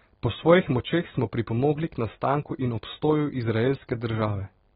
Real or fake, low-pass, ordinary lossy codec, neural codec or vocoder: real; 19.8 kHz; AAC, 16 kbps; none